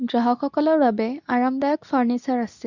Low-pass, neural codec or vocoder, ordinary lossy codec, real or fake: 7.2 kHz; codec, 24 kHz, 0.9 kbps, WavTokenizer, medium speech release version 2; none; fake